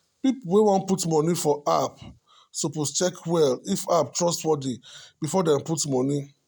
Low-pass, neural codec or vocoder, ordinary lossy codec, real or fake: none; none; none; real